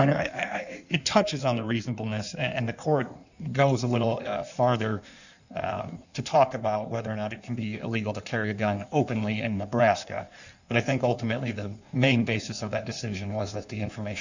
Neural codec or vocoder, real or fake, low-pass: codec, 16 kHz in and 24 kHz out, 1.1 kbps, FireRedTTS-2 codec; fake; 7.2 kHz